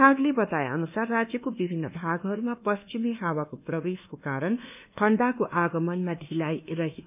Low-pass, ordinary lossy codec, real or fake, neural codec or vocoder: 3.6 kHz; none; fake; codec, 24 kHz, 1.2 kbps, DualCodec